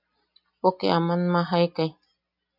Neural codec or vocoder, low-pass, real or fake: none; 5.4 kHz; real